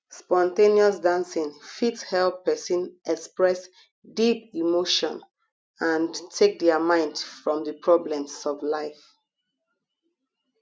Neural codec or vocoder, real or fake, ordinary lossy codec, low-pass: none; real; none; none